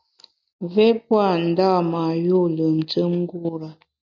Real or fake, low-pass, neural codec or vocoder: real; 7.2 kHz; none